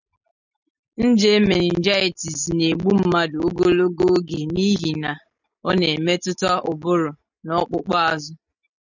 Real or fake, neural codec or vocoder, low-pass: real; none; 7.2 kHz